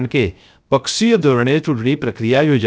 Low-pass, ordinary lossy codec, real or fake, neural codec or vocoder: none; none; fake; codec, 16 kHz, 0.3 kbps, FocalCodec